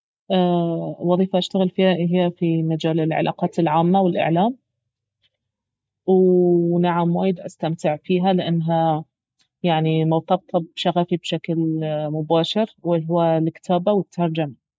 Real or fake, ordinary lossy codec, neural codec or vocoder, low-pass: real; none; none; none